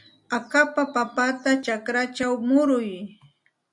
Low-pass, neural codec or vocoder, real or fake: 10.8 kHz; none; real